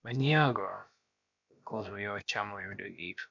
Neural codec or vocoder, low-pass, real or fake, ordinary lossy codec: codec, 16 kHz, about 1 kbps, DyCAST, with the encoder's durations; 7.2 kHz; fake; MP3, 64 kbps